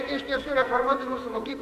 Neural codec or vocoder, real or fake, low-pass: codec, 44.1 kHz, 2.6 kbps, SNAC; fake; 14.4 kHz